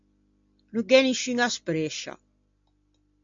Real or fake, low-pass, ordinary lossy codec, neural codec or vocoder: real; 7.2 kHz; AAC, 64 kbps; none